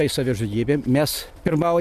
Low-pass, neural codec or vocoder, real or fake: 14.4 kHz; none; real